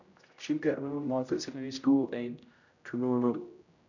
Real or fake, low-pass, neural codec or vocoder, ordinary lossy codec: fake; 7.2 kHz; codec, 16 kHz, 0.5 kbps, X-Codec, HuBERT features, trained on general audio; none